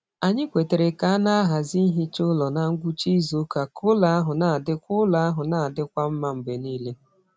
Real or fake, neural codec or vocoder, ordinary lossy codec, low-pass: real; none; none; none